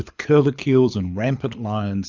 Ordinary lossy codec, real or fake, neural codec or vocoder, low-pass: Opus, 64 kbps; fake; codec, 24 kHz, 6 kbps, HILCodec; 7.2 kHz